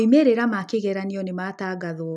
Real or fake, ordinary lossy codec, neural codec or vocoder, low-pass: real; none; none; none